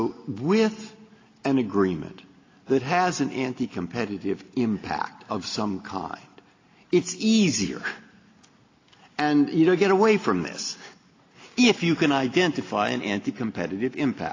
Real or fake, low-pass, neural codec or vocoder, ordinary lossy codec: real; 7.2 kHz; none; AAC, 32 kbps